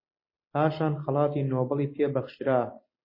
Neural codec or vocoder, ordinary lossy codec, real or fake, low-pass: none; MP3, 24 kbps; real; 5.4 kHz